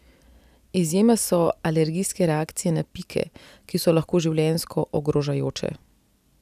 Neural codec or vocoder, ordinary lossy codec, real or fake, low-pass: none; none; real; 14.4 kHz